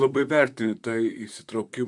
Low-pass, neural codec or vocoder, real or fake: 10.8 kHz; vocoder, 44.1 kHz, 128 mel bands, Pupu-Vocoder; fake